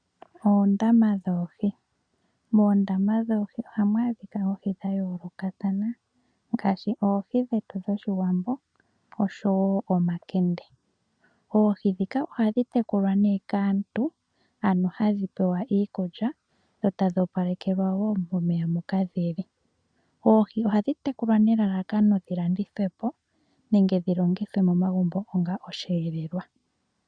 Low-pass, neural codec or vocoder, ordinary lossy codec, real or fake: 9.9 kHz; none; MP3, 96 kbps; real